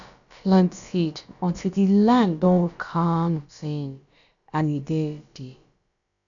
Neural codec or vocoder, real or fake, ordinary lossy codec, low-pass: codec, 16 kHz, about 1 kbps, DyCAST, with the encoder's durations; fake; none; 7.2 kHz